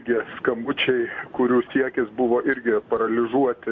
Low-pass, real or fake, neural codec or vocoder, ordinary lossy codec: 7.2 kHz; real; none; AAC, 48 kbps